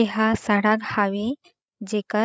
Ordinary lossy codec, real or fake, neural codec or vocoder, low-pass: none; fake; codec, 16 kHz, 16 kbps, FreqCodec, larger model; none